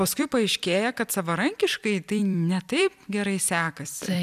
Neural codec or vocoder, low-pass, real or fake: vocoder, 44.1 kHz, 128 mel bands every 256 samples, BigVGAN v2; 14.4 kHz; fake